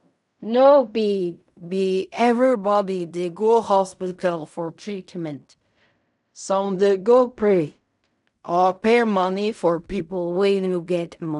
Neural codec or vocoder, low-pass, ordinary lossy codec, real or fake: codec, 16 kHz in and 24 kHz out, 0.4 kbps, LongCat-Audio-Codec, fine tuned four codebook decoder; 10.8 kHz; none; fake